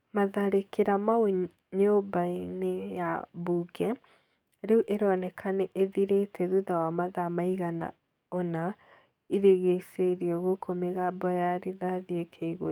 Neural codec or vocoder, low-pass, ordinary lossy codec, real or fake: codec, 44.1 kHz, 7.8 kbps, DAC; 19.8 kHz; none; fake